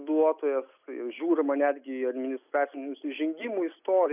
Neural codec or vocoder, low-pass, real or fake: none; 3.6 kHz; real